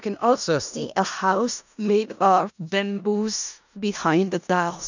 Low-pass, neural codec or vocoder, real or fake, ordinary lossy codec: 7.2 kHz; codec, 16 kHz in and 24 kHz out, 0.4 kbps, LongCat-Audio-Codec, four codebook decoder; fake; none